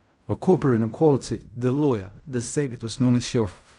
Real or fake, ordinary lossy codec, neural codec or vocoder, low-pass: fake; none; codec, 16 kHz in and 24 kHz out, 0.4 kbps, LongCat-Audio-Codec, fine tuned four codebook decoder; 10.8 kHz